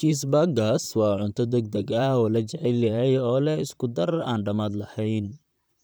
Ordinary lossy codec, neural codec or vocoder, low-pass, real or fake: none; vocoder, 44.1 kHz, 128 mel bands, Pupu-Vocoder; none; fake